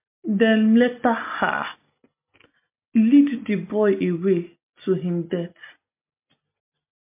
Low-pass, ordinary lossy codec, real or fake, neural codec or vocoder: 3.6 kHz; MP3, 32 kbps; real; none